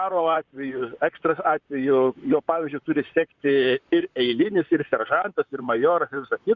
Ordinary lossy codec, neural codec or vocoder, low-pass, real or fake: Opus, 64 kbps; vocoder, 44.1 kHz, 128 mel bands, Pupu-Vocoder; 7.2 kHz; fake